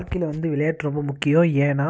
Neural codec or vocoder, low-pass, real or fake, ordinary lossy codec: none; none; real; none